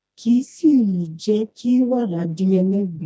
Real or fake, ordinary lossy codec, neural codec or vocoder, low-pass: fake; none; codec, 16 kHz, 1 kbps, FreqCodec, smaller model; none